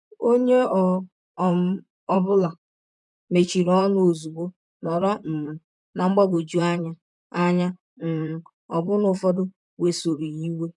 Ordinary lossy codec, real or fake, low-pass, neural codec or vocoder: none; fake; 10.8 kHz; vocoder, 44.1 kHz, 128 mel bands, Pupu-Vocoder